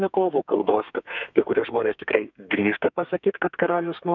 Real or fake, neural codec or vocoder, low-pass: fake; codec, 32 kHz, 1.9 kbps, SNAC; 7.2 kHz